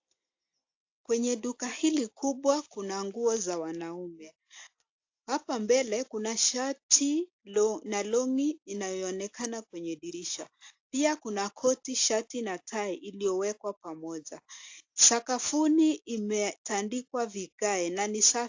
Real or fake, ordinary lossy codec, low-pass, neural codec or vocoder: real; AAC, 48 kbps; 7.2 kHz; none